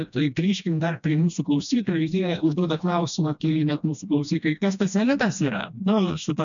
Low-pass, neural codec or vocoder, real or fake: 7.2 kHz; codec, 16 kHz, 1 kbps, FreqCodec, smaller model; fake